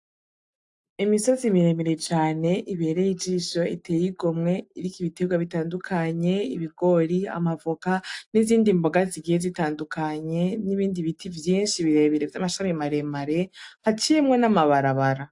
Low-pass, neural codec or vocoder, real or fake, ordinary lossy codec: 10.8 kHz; none; real; AAC, 64 kbps